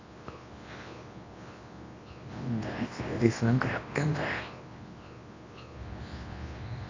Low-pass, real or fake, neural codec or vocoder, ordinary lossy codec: 7.2 kHz; fake; codec, 24 kHz, 0.9 kbps, WavTokenizer, large speech release; AAC, 32 kbps